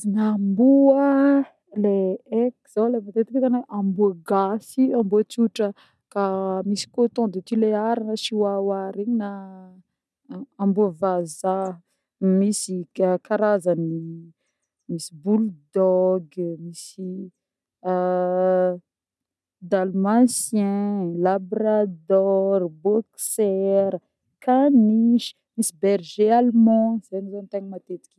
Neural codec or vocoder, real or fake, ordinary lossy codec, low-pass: none; real; none; none